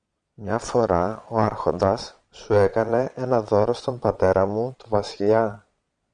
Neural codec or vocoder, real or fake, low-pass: vocoder, 22.05 kHz, 80 mel bands, Vocos; fake; 9.9 kHz